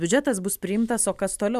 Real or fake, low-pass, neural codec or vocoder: real; 14.4 kHz; none